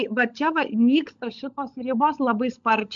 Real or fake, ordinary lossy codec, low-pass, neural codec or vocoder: fake; MP3, 64 kbps; 7.2 kHz; codec, 16 kHz, 16 kbps, FunCodec, trained on LibriTTS, 50 frames a second